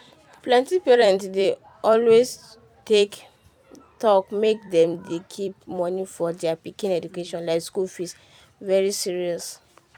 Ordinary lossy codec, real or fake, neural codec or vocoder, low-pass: none; fake; vocoder, 44.1 kHz, 128 mel bands every 256 samples, BigVGAN v2; 19.8 kHz